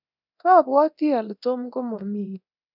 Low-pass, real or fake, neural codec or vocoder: 5.4 kHz; fake; codec, 24 kHz, 0.9 kbps, DualCodec